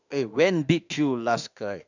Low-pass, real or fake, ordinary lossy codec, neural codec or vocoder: 7.2 kHz; fake; none; autoencoder, 48 kHz, 32 numbers a frame, DAC-VAE, trained on Japanese speech